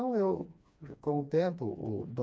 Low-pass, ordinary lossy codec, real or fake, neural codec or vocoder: none; none; fake; codec, 16 kHz, 2 kbps, FreqCodec, smaller model